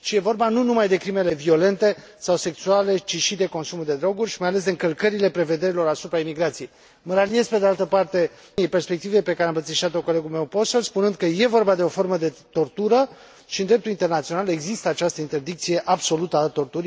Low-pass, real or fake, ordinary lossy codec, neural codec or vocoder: none; real; none; none